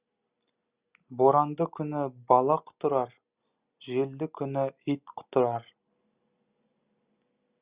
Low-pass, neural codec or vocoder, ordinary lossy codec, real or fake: 3.6 kHz; none; Opus, 64 kbps; real